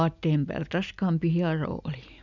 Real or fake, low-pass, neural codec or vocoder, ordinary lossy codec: real; 7.2 kHz; none; none